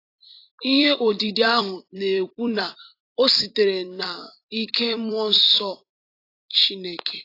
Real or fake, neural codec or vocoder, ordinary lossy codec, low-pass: fake; vocoder, 44.1 kHz, 128 mel bands every 256 samples, BigVGAN v2; AAC, 32 kbps; 5.4 kHz